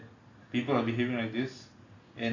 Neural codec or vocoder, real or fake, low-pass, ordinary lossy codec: none; real; 7.2 kHz; AAC, 32 kbps